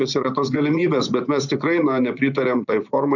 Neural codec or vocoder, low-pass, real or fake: vocoder, 24 kHz, 100 mel bands, Vocos; 7.2 kHz; fake